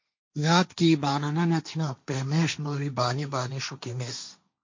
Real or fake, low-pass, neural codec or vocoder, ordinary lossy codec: fake; 7.2 kHz; codec, 16 kHz, 1.1 kbps, Voila-Tokenizer; MP3, 48 kbps